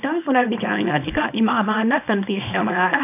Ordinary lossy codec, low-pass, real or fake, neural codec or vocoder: none; 3.6 kHz; fake; codec, 24 kHz, 0.9 kbps, WavTokenizer, small release